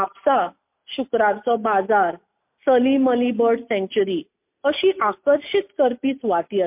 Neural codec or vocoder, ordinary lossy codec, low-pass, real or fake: none; MP3, 32 kbps; 3.6 kHz; real